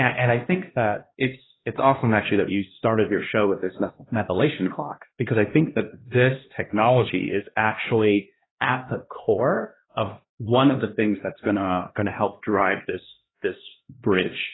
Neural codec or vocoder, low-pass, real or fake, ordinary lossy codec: codec, 16 kHz, 1 kbps, X-Codec, HuBERT features, trained on LibriSpeech; 7.2 kHz; fake; AAC, 16 kbps